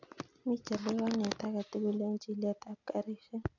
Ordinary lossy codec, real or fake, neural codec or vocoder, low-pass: none; real; none; 7.2 kHz